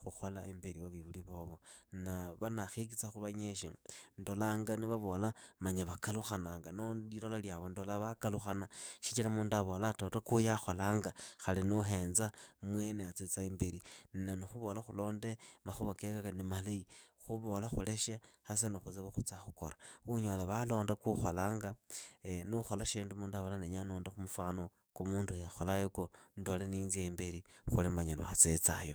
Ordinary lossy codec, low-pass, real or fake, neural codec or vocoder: none; none; fake; codec, 44.1 kHz, 7.8 kbps, DAC